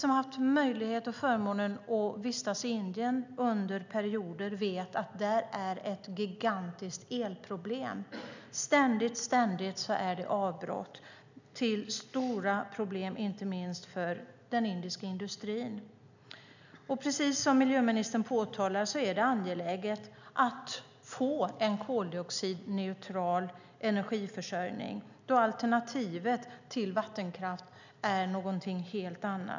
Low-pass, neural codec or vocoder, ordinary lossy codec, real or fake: 7.2 kHz; none; none; real